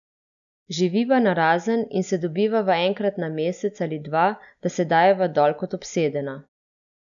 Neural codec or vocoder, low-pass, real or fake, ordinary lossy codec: none; 7.2 kHz; real; none